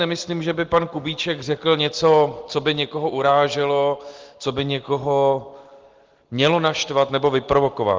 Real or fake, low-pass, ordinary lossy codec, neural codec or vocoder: real; 7.2 kHz; Opus, 16 kbps; none